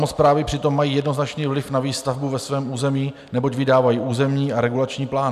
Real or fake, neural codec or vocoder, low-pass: real; none; 14.4 kHz